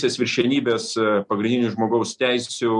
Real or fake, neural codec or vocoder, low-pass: real; none; 10.8 kHz